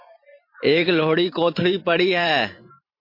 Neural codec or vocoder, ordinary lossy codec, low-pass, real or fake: vocoder, 44.1 kHz, 128 mel bands every 512 samples, BigVGAN v2; MP3, 32 kbps; 5.4 kHz; fake